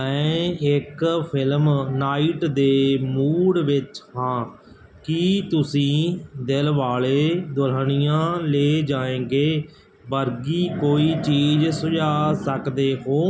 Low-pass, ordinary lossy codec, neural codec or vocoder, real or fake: none; none; none; real